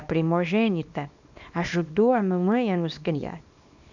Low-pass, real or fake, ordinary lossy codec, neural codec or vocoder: 7.2 kHz; fake; none; codec, 24 kHz, 0.9 kbps, WavTokenizer, small release